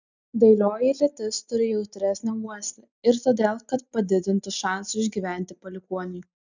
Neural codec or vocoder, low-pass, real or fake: none; 7.2 kHz; real